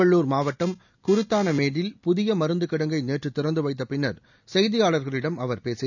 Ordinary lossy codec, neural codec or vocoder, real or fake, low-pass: none; none; real; 7.2 kHz